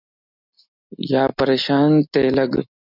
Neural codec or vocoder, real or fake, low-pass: none; real; 5.4 kHz